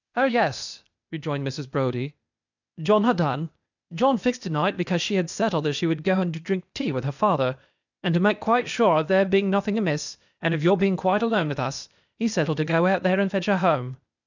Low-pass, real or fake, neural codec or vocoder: 7.2 kHz; fake; codec, 16 kHz, 0.8 kbps, ZipCodec